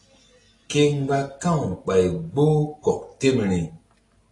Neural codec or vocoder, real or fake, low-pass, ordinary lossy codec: none; real; 10.8 kHz; AAC, 32 kbps